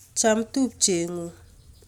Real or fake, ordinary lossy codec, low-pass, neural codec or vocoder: real; none; 19.8 kHz; none